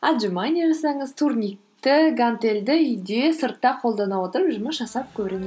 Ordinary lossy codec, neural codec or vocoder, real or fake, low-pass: none; none; real; none